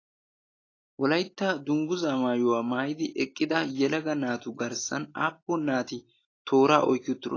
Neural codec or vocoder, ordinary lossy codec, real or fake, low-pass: none; AAC, 32 kbps; real; 7.2 kHz